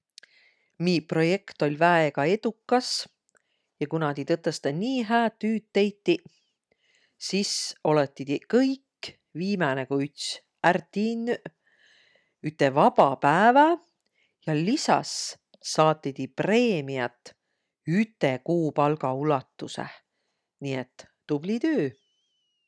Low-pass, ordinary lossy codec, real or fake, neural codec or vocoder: none; none; real; none